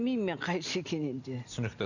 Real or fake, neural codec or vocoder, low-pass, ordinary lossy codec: real; none; 7.2 kHz; none